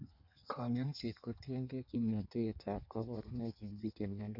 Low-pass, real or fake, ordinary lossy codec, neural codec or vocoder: 5.4 kHz; fake; none; codec, 16 kHz in and 24 kHz out, 1.1 kbps, FireRedTTS-2 codec